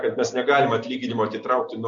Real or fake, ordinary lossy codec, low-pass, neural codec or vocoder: real; AAC, 64 kbps; 7.2 kHz; none